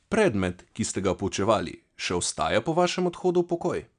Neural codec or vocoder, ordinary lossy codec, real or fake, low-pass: none; none; real; 9.9 kHz